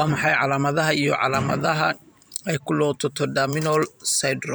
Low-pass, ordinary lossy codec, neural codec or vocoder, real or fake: none; none; vocoder, 44.1 kHz, 128 mel bands every 512 samples, BigVGAN v2; fake